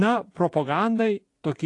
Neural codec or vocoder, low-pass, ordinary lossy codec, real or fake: vocoder, 24 kHz, 100 mel bands, Vocos; 10.8 kHz; AAC, 48 kbps; fake